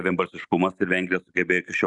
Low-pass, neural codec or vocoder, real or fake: 10.8 kHz; none; real